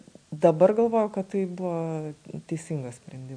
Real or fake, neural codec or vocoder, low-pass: real; none; 9.9 kHz